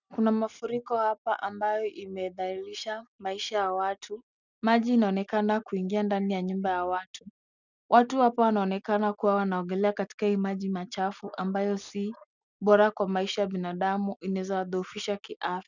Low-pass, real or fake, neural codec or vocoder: 7.2 kHz; real; none